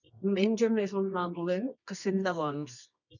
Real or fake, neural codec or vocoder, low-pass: fake; codec, 24 kHz, 0.9 kbps, WavTokenizer, medium music audio release; 7.2 kHz